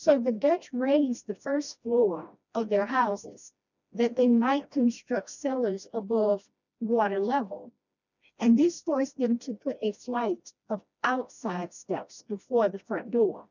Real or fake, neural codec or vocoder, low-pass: fake; codec, 16 kHz, 1 kbps, FreqCodec, smaller model; 7.2 kHz